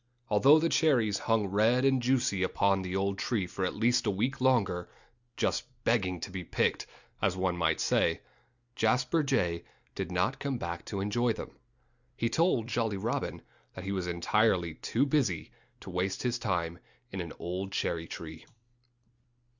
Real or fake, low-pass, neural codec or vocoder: real; 7.2 kHz; none